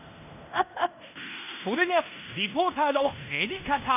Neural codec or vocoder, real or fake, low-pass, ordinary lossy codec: codec, 16 kHz in and 24 kHz out, 0.9 kbps, LongCat-Audio-Codec, fine tuned four codebook decoder; fake; 3.6 kHz; none